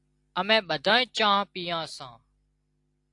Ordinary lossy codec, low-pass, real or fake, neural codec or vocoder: AAC, 64 kbps; 10.8 kHz; real; none